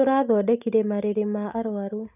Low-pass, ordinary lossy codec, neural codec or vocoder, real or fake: 3.6 kHz; none; none; real